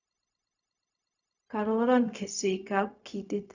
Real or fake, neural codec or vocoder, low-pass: fake; codec, 16 kHz, 0.4 kbps, LongCat-Audio-Codec; 7.2 kHz